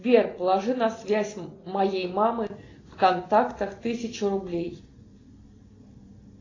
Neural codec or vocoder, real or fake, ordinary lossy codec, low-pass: none; real; AAC, 32 kbps; 7.2 kHz